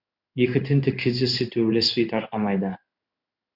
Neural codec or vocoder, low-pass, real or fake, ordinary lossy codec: codec, 16 kHz in and 24 kHz out, 1 kbps, XY-Tokenizer; 5.4 kHz; fake; Opus, 64 kbps